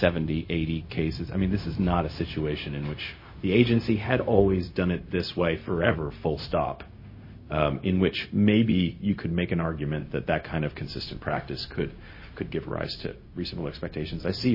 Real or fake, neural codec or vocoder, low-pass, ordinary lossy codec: fake; codec, 16 kHz, 0.4 kbps, LongCat-Audio-Codec; 5.4 kHz; MP3, 24 kbps